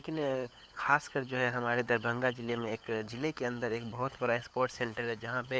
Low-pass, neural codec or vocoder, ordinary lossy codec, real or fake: none; codec, 16 kHz, 4 kbps, FunCodec, trained on LibriTTS, 50 frames a second; none; fake